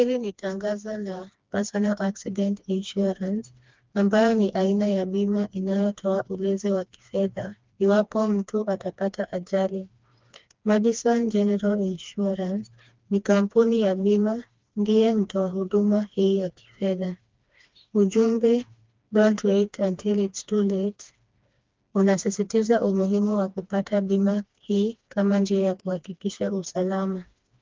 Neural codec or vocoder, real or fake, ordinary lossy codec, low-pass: codec, 16 kHz, 2 kbps, FreqCodec, smaller model; fake; Opus, 24 kbps; 7.2 kHz